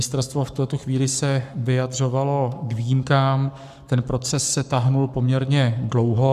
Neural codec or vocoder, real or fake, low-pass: codec, 44.1 kHz, 7.8 kbps, Pupu-Codec; fake; 14.4 kHz